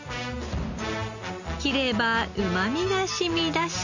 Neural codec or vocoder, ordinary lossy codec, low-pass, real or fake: none; none; 7.2 kHz; real